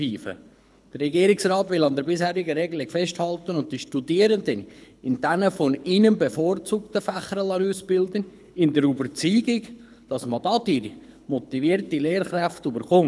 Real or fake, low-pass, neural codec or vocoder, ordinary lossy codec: fake; none; codec, 24 kHz, 6 kbps, HILCodec; none